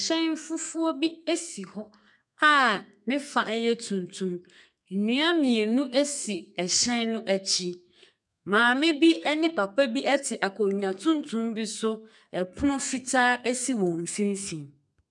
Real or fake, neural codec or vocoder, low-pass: fake; codec, 32 kHz, 1.9 kbps, SNAC; 10.8 kHz